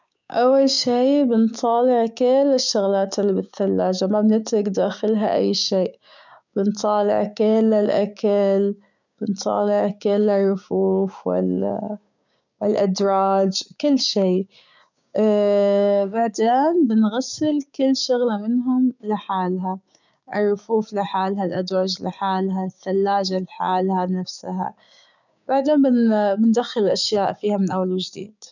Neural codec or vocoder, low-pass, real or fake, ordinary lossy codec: codec, 16 kHz, 6 kbps, DAC; 7.2 kHz; fake; none